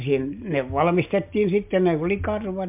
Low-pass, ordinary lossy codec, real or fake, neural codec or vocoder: 3.6 kHz; MP3, 32 kbps; real; none